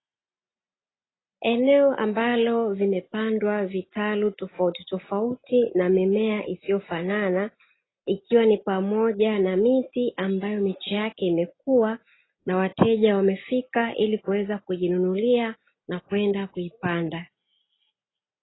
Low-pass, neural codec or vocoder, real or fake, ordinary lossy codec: 7.2 kHz; none; real; AAC, 16 kbps